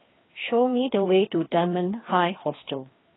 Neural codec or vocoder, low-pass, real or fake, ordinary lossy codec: codec, 16 kHz, 2 kbps, FreqCodec, larger model; 7.2 kHz; fake; AAC, 16 kbps